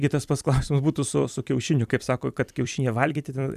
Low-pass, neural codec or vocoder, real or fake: 14.4 kHz; vocoder, 44.1 kHz, 128 mel bands every 256 samples, BigVGAN v2; fake